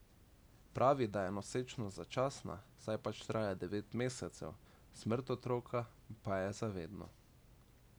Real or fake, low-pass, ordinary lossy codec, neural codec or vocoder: real; none; none; none